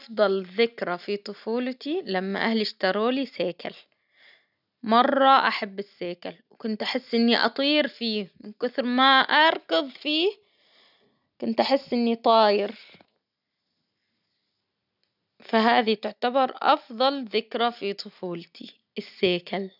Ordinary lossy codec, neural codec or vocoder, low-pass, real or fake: none; none; 5.4 kHz; real